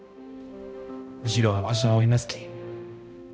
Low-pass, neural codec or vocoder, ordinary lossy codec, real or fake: none; codec, 16 kHz, 0.5 kbps, X-Codec, HuBERT features, trained on balanced general audio; none; fake